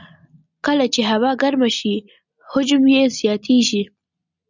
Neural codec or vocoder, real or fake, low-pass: none; real; 7.2 kHz